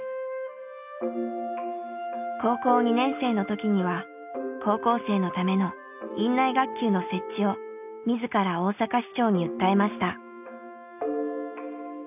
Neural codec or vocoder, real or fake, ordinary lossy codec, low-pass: none; real; none; 3.6 kHz